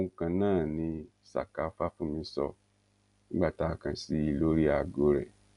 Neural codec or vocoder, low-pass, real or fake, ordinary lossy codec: none; 10.8 kHz; real; none